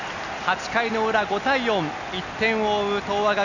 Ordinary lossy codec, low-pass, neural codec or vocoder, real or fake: none; 7.2 kHz; none; real